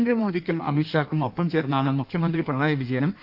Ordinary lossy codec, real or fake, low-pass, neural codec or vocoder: none; fake; 5.4 kHz; codec, 16 kHz in and 24 kHz out, 1.1 kbps, FireRedTTS-2 codec